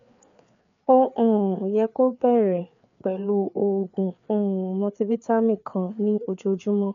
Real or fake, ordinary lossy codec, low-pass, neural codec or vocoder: fake; none; 7.2 kHz; codec, 16 kHz, 4 kbps, FreqCodec, larger model